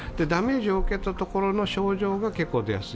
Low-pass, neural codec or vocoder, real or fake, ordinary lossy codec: none; none; real; none